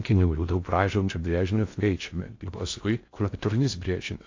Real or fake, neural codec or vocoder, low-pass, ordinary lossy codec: fake; codec, 16 kHz in and 24 kHz out, 0.6 kbps, FocalCodec, streaming, 4096 codes; 7.2 kHz; AAC, 48 kbps